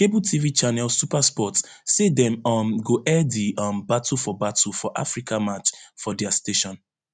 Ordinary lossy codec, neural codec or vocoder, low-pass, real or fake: none; none; none; real